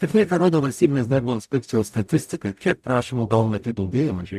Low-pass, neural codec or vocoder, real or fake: 14.4 kHz; codec, 44.1 kHz, 0.9 kbps, DAC; fake